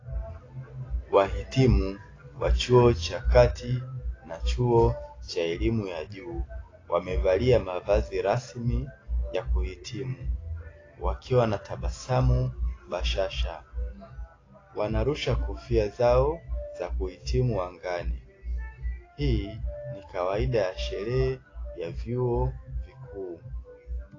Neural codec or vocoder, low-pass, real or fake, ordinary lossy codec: none; 7.2 kHz; real; AAC, 32 kbps